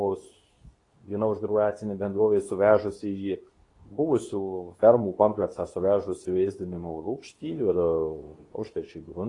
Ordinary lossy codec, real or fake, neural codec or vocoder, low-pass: AAC, 32 kbps; fake; codec, 24 kHz, 0.9 kbps, WavTokenizer, medium speech release version 2; 10.8 kHz